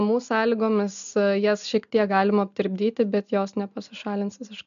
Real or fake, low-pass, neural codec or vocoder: real; 7.2 kHz; none